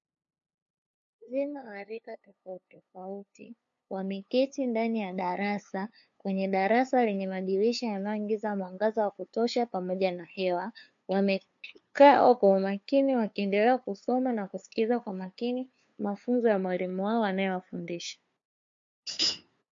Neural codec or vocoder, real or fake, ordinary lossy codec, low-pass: codec, 16 kHz, 2 kbps, FunCodec, trained on LibriTTS, 25 frames a second; fake; MP3, 48 kbps; 7.2 kHz